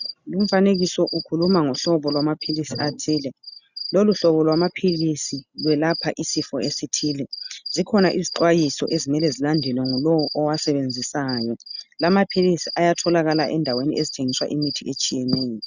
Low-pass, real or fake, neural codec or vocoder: 7.2 kHz; real; none